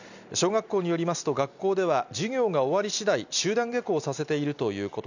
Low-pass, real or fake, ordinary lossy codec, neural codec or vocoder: 7.2 kHz; real; none; none